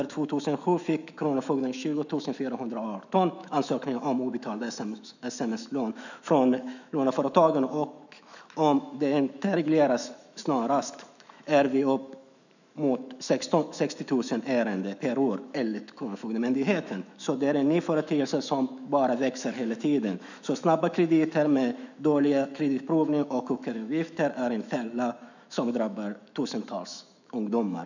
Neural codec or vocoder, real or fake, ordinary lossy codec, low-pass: autoencoder, 48 kHz, 128 numbers a frame, DAC-VAE, trained on Japanese speech; fake; none; 7.2 kHz